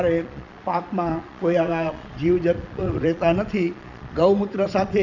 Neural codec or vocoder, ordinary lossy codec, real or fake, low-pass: vocoder, 22.05 kHz, 80 mel bands, Vocos; none; fake; 7.2 kHz